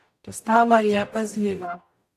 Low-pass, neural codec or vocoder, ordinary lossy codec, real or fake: 14.4 kHz; codec, 44.1 kHz, 0.9 kbps, DAC; AAC, 64 kbps; fake